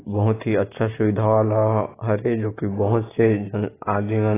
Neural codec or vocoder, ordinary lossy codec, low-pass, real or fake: none; AAC, 16 kbps; 3.6 kHz; real